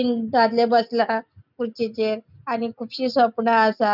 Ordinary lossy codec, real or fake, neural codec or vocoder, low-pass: none; real; none; 5.4 kHz